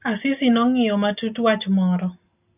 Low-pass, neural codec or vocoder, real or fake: 3.6 kHz; none; real